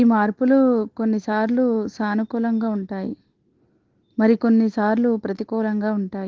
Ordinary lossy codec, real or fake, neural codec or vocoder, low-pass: Opus, 16 kbps; real; none; 7.2 kHz